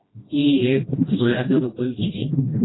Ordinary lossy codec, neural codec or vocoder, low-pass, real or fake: AAC, 16 kbps; codec, 16 kHz, 1 kbps, FreqCodec, smaller model; 7.2 kHz; fake